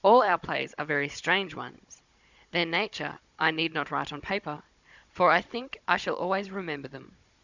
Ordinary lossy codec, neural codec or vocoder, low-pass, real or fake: Opus, 64 kbps; codec, 16 kHz, 16 kbps, FunCodec, trained on Chinese and English, 50 frames a second; 7.2 kHz; fake